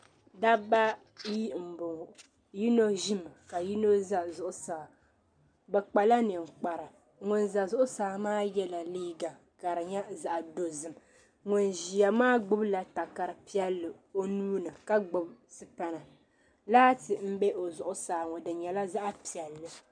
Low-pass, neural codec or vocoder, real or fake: 9.9 kHz; none; real